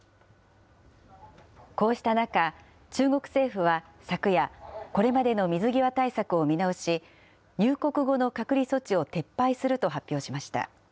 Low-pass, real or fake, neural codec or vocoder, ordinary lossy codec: none; real; none; none